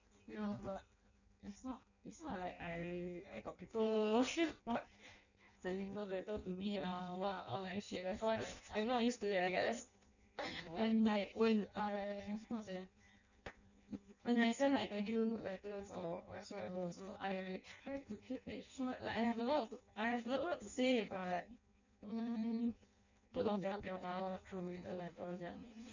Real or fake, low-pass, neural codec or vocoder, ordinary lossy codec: fake; 7.2 kHz; codec, 16 kHz in and 24 kHz out, 0.6 kbps, FireRedTTS-2 codec; none